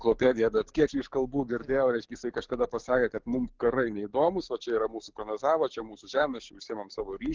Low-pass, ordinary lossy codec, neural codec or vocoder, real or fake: 7.2 kHz; Opus, 16 kbps; codec, 44.1 kHz, 7.8 kbps, DAC; fake